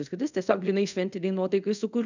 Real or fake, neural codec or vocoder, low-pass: fake; codec, 24 kHz, 0.5 kbps, DualCodec; 7.2 kHz